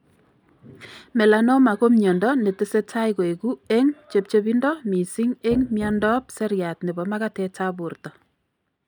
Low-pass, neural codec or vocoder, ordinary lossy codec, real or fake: 19.8 kHz; none; none; real